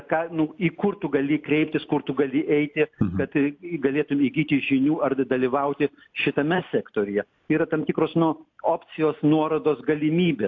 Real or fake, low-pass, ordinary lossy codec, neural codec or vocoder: real; 7.2 kHz; AAC, 48 kbps; none